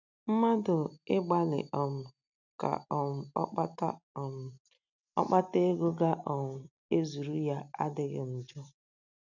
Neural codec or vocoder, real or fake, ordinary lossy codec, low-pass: none; real; none; 7.2 kHz